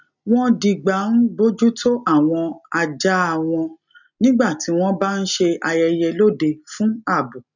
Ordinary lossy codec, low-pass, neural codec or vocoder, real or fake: none; 7.2 kHz; none; real